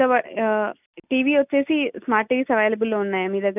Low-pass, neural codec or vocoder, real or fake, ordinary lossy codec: 3.6 kHz; none; real; none